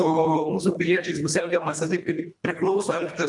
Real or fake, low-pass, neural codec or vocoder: fake; 10.8 kHz; codec, 24 kHz, 1.5 kbps, HILCodec